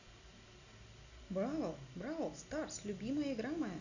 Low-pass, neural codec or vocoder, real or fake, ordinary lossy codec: 7.2 kHz; none; real; none